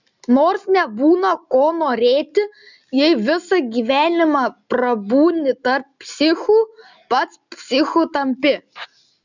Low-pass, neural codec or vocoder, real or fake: 7.2 kHz; none; real